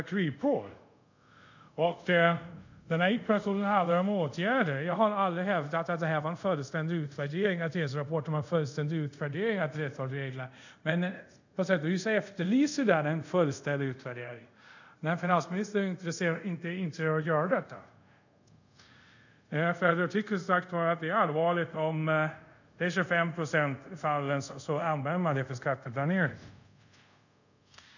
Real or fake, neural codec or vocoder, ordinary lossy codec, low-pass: fake; codec, 24 kHz, 0.5 kbps, DualCodec; none; 7.2 kHz